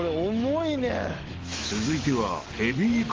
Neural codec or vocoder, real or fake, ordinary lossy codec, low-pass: autoencoder, 48 kHz, 128 numbers a frame, DAC-VAE, trained on Japanese speech; fake; Opus, 16 kbps; 7.2 kHz